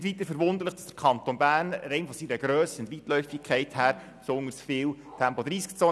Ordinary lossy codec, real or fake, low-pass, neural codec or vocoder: none; real; none; none